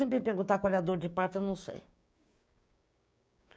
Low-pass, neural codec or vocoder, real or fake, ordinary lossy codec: none; codec, 16 kHz, 6 kbps, DAC; fake; none